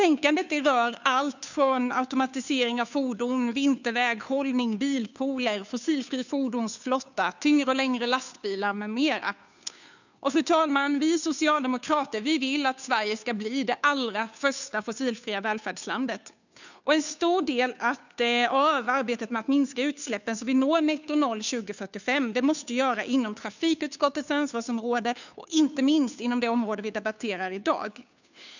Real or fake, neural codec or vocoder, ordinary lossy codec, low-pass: fake; codec, 16 kHz, 2 kbps, FunCodec, trained on Chinese and English, 25 frames a second; none; 7.2 kHz